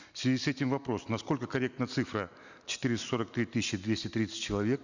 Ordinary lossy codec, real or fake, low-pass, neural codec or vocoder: none; real; 7.2 kHz; none